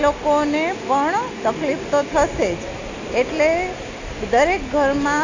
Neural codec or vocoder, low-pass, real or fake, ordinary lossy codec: none; 7.2 kHz; real; AAC, 48 kbps